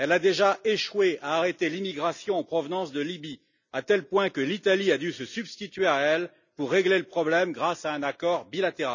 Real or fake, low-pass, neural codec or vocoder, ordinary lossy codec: real; 7.2 kHz; none; none